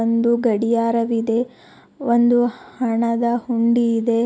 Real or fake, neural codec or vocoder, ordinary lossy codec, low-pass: real; none; none; none